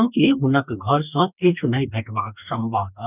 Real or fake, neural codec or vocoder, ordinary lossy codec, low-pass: fake; codec, 44.1 kHz, 2.6 kbps, DAC; none; 3.6 kHz